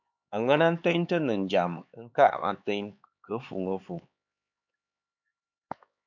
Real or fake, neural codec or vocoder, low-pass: fake; codec, 16 kHz, 4 kbps, X-Codec, HuBERT features, trained on LibriSpeech; 7.2 kHz